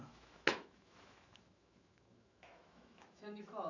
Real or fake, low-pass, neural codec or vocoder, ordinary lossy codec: fake; 7.2 kHz; autoencoder, 48 kHz, 128 numbers a frame, DAC-VAE, trained on Japanese speech; none